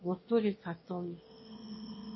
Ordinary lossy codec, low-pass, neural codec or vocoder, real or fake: MP3, 24 kbps; 7.2 kHz; autoencoder, 22.05 kHz, a latent of 192 numbers a frame, VITS, trained on one speaker; fake